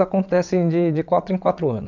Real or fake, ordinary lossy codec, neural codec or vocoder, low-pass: fake; none; vocoder, 22.05 kHz, 80 mel bands, WaveNeXt; 7.2 kHz